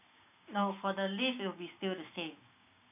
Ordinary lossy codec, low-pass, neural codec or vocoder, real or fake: none; 3.6 kHz; codec, 16 kHz, 6 kbps, DAC; fake